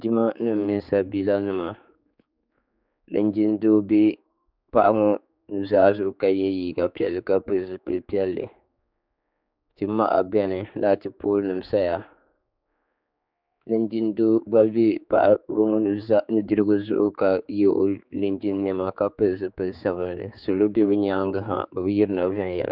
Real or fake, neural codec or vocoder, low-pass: fake; codec, 16 kHz, 4 kbps, X-Codec, HuBERT features, trained on general audio; 5.4 kHz